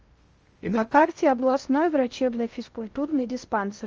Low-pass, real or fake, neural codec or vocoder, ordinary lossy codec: 7.2 kHz; fake; codec, 16 kHz in and 24 kHz out, 0.6 kbps, FocalCodec, streaming, 2048 codes; Opus, 24 kbps